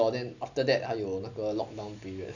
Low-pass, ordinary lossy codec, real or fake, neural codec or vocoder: 7.2 kHz; none; real; none